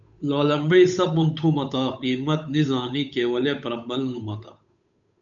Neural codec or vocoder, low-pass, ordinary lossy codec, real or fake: codec, 16 kHz, 8 kbps, FunCodec, trained on Chinese and English, 25 frames a second; 7.2 kHz; MP3, 96 kbps; fake